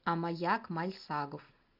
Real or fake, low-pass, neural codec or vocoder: real; 5.4 kHz; none